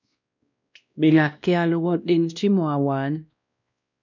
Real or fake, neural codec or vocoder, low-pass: fake; codec, 16 kHz, 1 kbps, X-Codec, WavLM features, trained on Multilingual LibriSpeech; 7.2 kHz